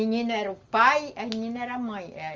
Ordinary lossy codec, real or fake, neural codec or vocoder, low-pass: Opus, 32 kbps; real; none; 7.2 kHz